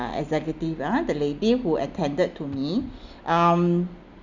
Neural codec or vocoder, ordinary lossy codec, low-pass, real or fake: none; none; 7.2 kHz; real